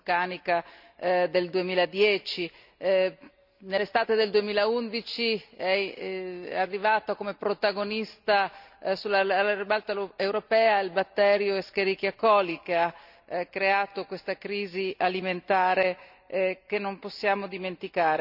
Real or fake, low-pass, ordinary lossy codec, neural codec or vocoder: real; 5.4 kHz; none; none